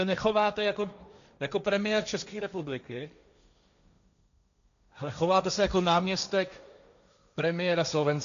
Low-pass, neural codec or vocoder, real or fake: 7.2 kHz; codec, 16 kHz, 1.1 kbps, Voila-Tokenizer; fake